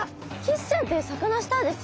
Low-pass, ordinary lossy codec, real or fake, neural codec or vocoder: none; none; real; none